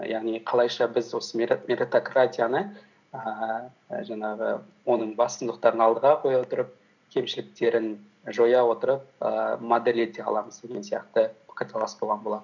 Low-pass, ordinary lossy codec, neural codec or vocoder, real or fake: 7.2 kHz; none; none; real